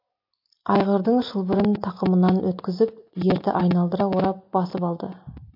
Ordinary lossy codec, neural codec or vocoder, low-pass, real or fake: MP3, 32 kbps; none; 5.4 kHz; real